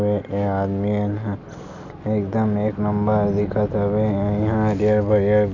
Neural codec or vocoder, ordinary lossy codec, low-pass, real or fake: none; none; 7.2 kHz; real